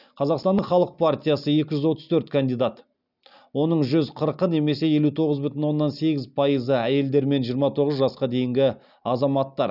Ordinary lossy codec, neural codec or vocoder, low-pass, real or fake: none; none; 5.4 kHz; real